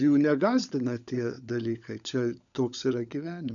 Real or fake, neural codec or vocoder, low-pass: fake; codec, 16 kHz, 16 kbps, FunCodec, trained on LibriTTS, 50 frames a second; 7.2 kHz